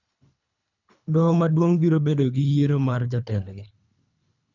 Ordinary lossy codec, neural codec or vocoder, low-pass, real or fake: none; codec, 24 kHz, 3 kbps, HILCodec; 7.2 kHz; fake